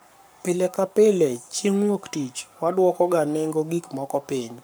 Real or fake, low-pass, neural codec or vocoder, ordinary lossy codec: fake; none; codec, 44.1 kHz, 7.8 kbps, Pupu-Codec; none